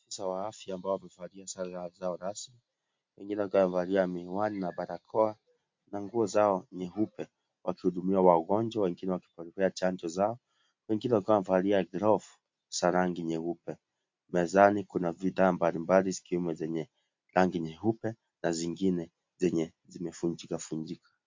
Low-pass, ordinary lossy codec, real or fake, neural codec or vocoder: 7.2 kHz; MP3, 48 kbps; real; none